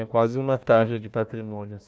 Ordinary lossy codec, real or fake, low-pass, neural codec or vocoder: none; fake; none; codec, 16 kHz, 1 kbps, FunCodec, trained on Chinese and English, 50 frames a second